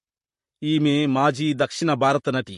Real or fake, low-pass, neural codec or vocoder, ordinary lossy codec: fake; 14.4 kHz; vocoder, 44.1 kHz, 128 mel bands, Pupu-Vocoder; MP3, 48 kbps